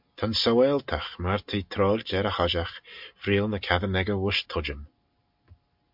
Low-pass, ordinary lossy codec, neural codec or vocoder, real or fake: 5.4 kHz; MP3, 48 kbps; none; real